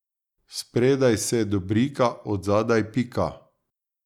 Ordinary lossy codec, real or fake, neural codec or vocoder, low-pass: none; real; none; 19.8 kHz